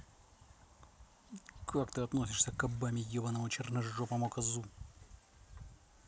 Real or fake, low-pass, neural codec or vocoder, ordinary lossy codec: real; none; none; none